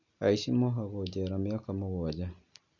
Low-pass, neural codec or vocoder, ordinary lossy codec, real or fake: 7.2 kHz; none; none; real